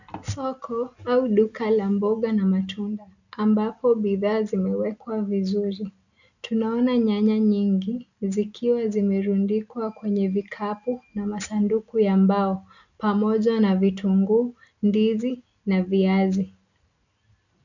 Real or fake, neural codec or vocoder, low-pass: real; none; 7.2 kHz